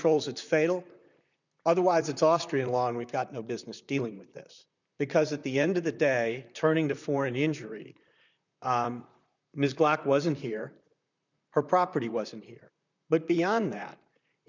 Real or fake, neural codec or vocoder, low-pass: fake; vocoder, 44.1 kHz, 128 mel bands, Pupu-Vocoder; 7.2 kHz